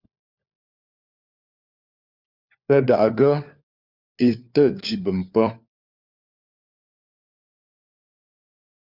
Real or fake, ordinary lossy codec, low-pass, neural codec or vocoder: fake; Opus, 64 kbps; 5.4 kHz; codec, 16 kHz, 4 kbps, FunCodec, trained on LibriTTS, 50 frames a second